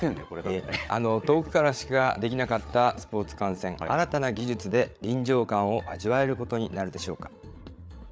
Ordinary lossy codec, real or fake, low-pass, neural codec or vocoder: none; fake; none; codec, 16 kHz, 8 kbps, FreqCodec, larger model